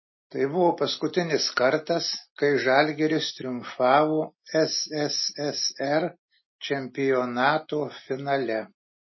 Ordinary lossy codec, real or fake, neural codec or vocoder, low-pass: MP3, 24 kbps; real; none; 7.2 kHz